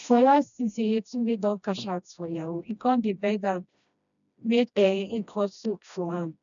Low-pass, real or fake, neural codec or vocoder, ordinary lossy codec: 7.2 kHz; fake; codec, 16 kHz, 1 kbps, FreqCodec, smaller model; none